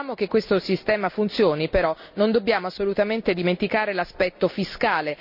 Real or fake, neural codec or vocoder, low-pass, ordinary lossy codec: real; none; 5.4 kHz; none